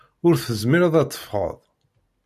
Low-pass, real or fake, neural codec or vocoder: 14.4 kHz; real; none